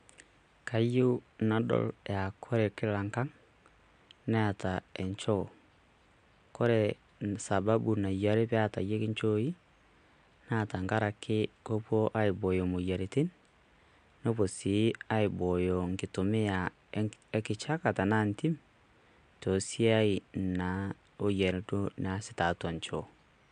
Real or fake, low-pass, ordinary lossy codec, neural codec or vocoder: real; 9.9 kHz; MP3, 64 kbps; none